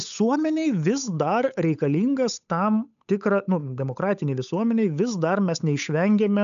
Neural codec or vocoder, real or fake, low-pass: codec, 16 kHz, 8 kbps, FunCodec, trained on Chinese and English, 25 frames a second; fake; 7.2 kHz